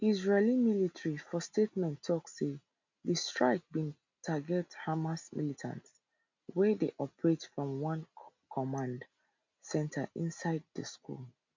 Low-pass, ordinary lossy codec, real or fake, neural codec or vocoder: 7.2 kHz; MP3, 64 kbps; real; none